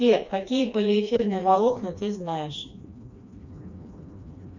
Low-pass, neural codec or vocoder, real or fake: 7.2 kHz; codec, 16 kHz, 2 kbps, FreqCodec, smaller model; fake